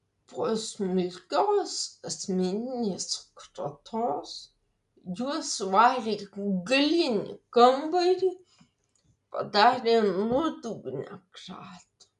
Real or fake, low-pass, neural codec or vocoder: real; 10.8 kHz; none